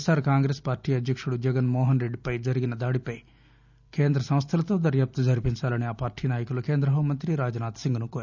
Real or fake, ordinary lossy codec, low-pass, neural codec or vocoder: real; none; 7.2 kHz; none